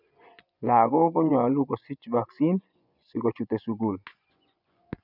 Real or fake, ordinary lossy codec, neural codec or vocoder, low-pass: fake; none; vocoder, 24 kHz, 100 mel bands, Vocos; 5.4 kHz